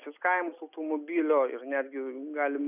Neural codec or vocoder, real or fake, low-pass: none; real; 3.6 kHz